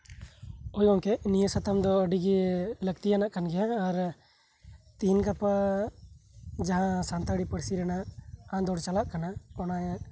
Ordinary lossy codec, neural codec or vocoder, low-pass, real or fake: none; none; none; real